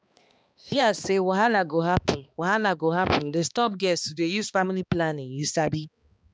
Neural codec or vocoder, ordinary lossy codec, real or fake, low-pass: codec, 16 kHz, 2 kbps, X-Codec, HuBERT features, trained on balanced general audio; none; fake; none